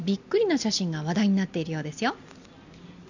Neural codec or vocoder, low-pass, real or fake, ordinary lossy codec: none; 7.2 kHz; real; none